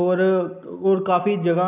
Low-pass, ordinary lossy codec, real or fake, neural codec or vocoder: 3.6 kHz; none; real; none